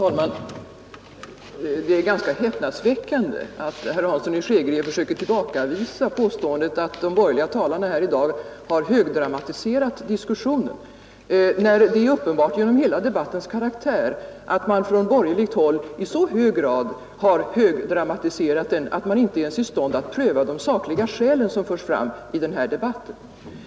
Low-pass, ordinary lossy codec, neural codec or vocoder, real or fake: none; none; none; real